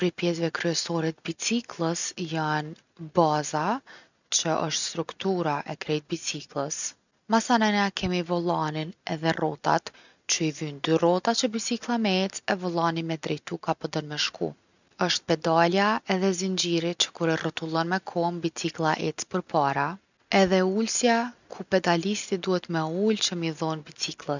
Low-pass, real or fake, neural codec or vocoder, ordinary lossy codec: 7.2 kHz; real; none; none